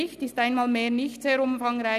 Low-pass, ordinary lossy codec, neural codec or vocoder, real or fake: 14.4 kHz; none; none; real